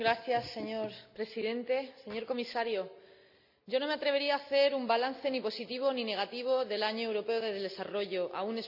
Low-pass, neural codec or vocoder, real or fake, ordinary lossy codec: 5.4 kHz; none; real; none